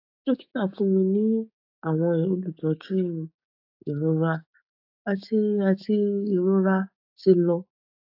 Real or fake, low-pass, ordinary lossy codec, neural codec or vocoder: fake; 5.4 kHz; AAC, 48 kbps; codec, 16 kHz, 6 kbps, DAC